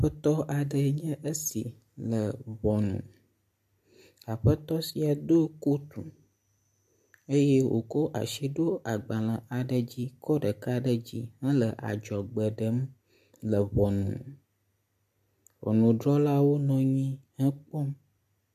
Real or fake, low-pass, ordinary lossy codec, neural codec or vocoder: real; 14.4 kHz; MP3, 64 kbps; none